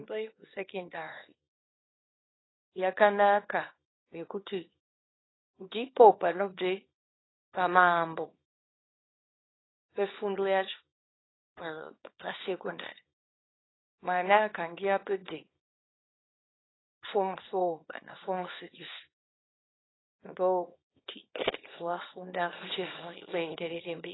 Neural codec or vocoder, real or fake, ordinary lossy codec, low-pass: codec, 24 kHz, 0.9 kbps, WavTokenizer, small release; fake; AAC, 16 kbps; 7.2 kHz